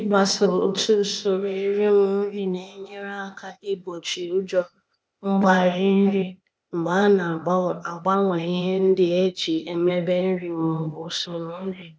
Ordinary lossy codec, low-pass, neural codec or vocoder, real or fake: none; none; codec, 16 kHz, 0.8 kbps, ZipCodec; fake